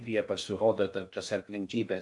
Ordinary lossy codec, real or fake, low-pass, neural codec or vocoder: MP3, 64 kbps; fake; 10.8 kHz; codec, 16 kHz in and 24 kHz out, 0.6 kbps, FocalCodec, streaming, 2048 codes